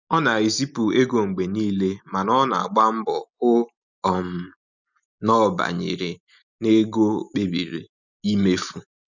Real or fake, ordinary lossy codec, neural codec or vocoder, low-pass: real; none; none; 7.2 kHz